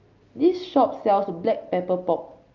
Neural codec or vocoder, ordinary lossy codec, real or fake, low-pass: none; Opus, 32 kbps; real; 7.2 kHz